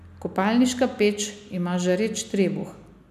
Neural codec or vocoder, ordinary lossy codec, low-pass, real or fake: none; none; 14.4 kHz; real